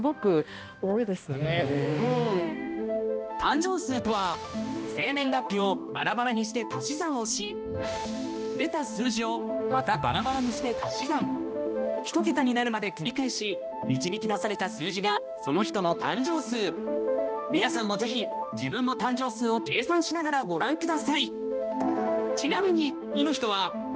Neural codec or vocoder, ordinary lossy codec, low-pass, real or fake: codec, 16 kHz, 1 kbps, X-Codec, HuBERT features, trained on balanced general audio; none; none; fake